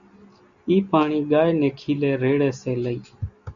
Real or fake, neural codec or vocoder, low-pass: real; none; 7.2 kHz